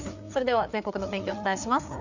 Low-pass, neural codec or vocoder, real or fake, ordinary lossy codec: 7.2 kHz; codec, 16 kHz, 4 kbps, FreqCodec, larger model; fake; none